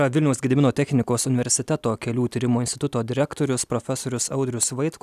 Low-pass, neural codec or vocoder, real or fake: 14.4 kHz; none; real